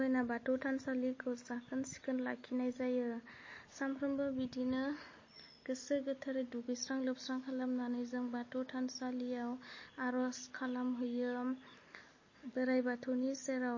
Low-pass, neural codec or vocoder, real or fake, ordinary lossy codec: 7.2 kHz; none; real; MP3, 32 kbps